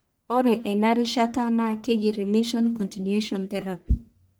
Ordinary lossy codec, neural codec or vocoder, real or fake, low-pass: none; codec, 44.1 kHz, 1.7 kbps, Pupu-Codec; fake; none